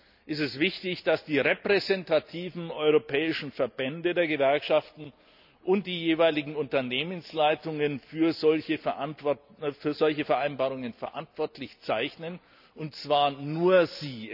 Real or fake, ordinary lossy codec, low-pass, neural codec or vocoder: real; none; 5.4 kHz; none